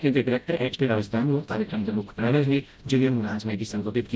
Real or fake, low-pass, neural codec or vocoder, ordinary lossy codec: fake; none; codec, 16 kHz, 0.5 kbps, FreqCodec, smaller model; none